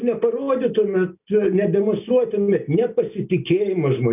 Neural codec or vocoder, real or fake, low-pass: none; real; 3.6 kHz